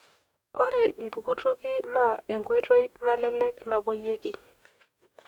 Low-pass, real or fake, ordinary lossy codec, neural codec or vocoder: 19.8 kHz; fake; none; codec, 44.1 kHz, 2.6 kbps, DAC